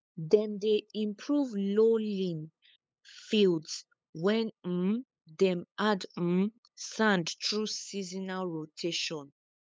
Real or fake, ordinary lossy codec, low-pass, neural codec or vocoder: fake; none; none; codec, 16 kHz, 8 kbps, FunCodec, trained on LibriTTS, 25 frames a second